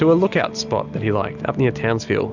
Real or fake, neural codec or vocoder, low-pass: real; none; 7.2 kHz